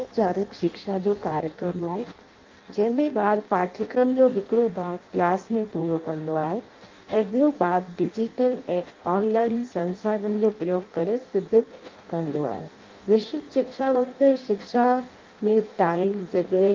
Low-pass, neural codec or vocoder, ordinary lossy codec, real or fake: 7.2 kHz; codec, 16 kHz in and 24 kHz out, 0.6 kbps, FireRedTTS-2 codec; Opus, 24 kbps; fake